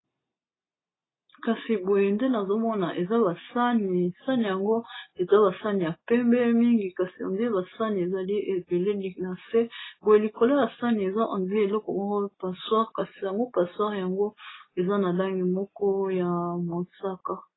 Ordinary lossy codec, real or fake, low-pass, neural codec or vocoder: AAC, 16 kbps; real; 7.2 kHz; none